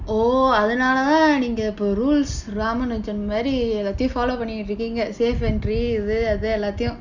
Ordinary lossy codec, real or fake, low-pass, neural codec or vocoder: none; real; 7.2 kHz; none